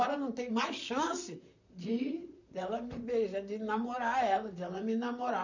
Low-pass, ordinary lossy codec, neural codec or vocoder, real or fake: 7.2 kHz; none; vocoder, 44.1 kHz, 128 mel bands, Pupu-Vocoder; fake